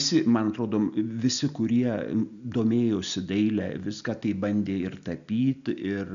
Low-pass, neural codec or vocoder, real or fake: 7.2 kHz; none; real